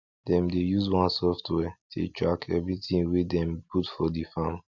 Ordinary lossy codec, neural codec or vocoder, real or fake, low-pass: none; none; real; 7.2 kHz